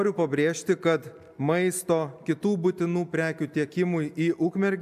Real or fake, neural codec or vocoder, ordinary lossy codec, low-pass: real; none; AAC, 96 kbps; 14.4 kHz